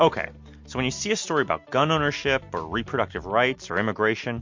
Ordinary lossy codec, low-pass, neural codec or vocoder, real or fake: MP3, 48 kbps; 7.2 kHz; none; real